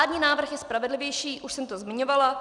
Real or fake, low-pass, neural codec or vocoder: real; 10.8 kHz; none